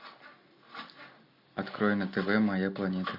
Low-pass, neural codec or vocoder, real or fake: 5.4 kHz; none; real